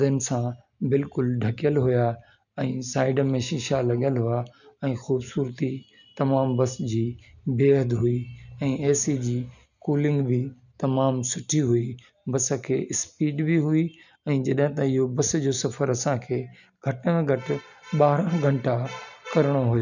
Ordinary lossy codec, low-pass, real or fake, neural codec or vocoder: none; 7.2 kHz; real; none